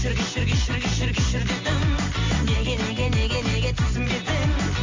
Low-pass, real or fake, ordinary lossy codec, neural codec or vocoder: 7.2 kHz; real; MP3, 48 kbps; none